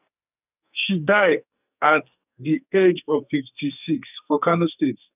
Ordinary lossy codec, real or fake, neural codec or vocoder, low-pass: none; fake; codec, 44.1 kHz, 2.6 kbps, SNAC; 3.6 kHz